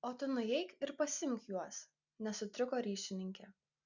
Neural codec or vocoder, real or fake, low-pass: none; real; 7.2 kHz